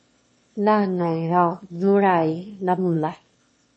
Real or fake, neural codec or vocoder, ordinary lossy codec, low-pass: fake; autoencoder, 22.05 kHz, a latent of 192 numbers a frame, VITS, trained on one speaker; MP3, 32 kbps; 9.9 kHz